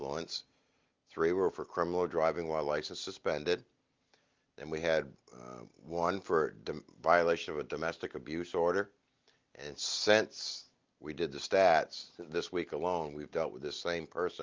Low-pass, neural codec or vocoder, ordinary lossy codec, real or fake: 7.2 kHz; none; Opus, 32 kbps; real